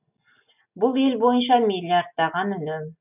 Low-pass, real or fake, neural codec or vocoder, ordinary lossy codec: 3.6 kHz; real; none; none